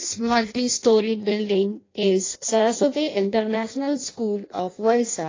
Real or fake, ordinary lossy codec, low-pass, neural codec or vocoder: fake; AAC, 32 kbps; 7.2 kHz; codec, 16 kHz in and 24 kHz out, 0.6 kbps, FireRedTTS-2 codec